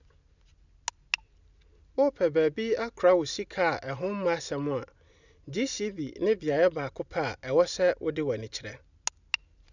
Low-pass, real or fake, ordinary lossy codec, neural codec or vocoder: 7.2 kHz; real; none; none